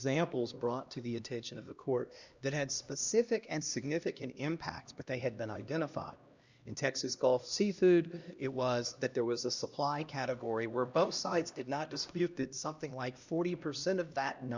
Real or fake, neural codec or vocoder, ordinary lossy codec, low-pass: fake; codec, 16 kHz, 1 kbps, X-Codec, HuBERT features, trained on LibriSpeech; Opus, 64 kbps; 7.2 kHz